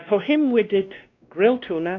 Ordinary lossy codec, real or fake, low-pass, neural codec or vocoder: AAC, 48 kbps; fake; 7.2 kHz; codec, 16 kHz, 1 kbps, X-Codec, WavLM features, trained on Multilingual LibriSpeech